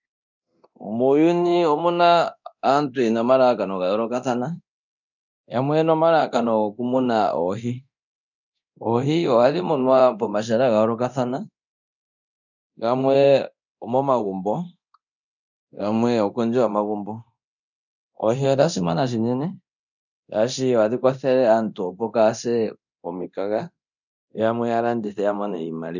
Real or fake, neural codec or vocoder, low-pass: fake; codec, 24 kHz, 0.9 kbps, DualCodec; 7.2 kHz